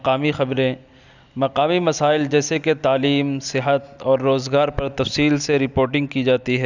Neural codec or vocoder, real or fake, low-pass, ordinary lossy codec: none; real; 7.2 kHz; none